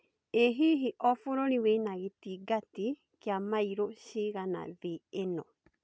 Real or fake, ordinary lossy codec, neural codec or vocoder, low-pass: real; none; none; none